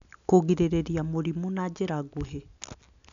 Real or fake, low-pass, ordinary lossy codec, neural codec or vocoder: real; 7.2 kHz; none; none